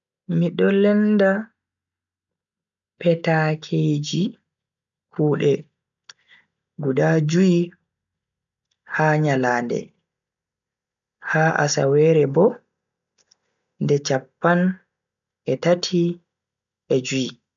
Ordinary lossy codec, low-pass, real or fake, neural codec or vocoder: none; 7.2 kHz; real; none